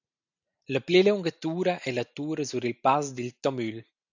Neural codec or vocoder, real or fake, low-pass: none; real; 7.2 kHz